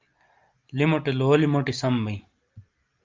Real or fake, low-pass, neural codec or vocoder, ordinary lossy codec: real; 7.2 kHz; none; Opus, 32 kbps